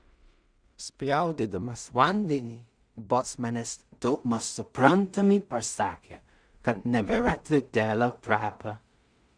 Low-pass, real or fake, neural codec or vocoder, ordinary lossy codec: 9.9 kHz; fake; codec, 16 kHz in and 24 kHz out, 0.4 kbps, LongCat-Audio-Codec, two codebook decoder; Opus, 64 kbps